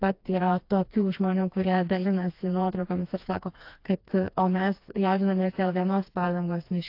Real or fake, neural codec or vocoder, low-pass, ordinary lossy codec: fake; codec, 16 kHz, 2 kbps, FreqCodec, smaller model; 5.4 kHz; AAC, 32 kbps